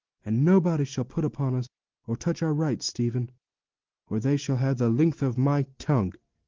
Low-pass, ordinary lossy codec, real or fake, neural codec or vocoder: 7.2 kHz; Opus, 16 kbps; real; none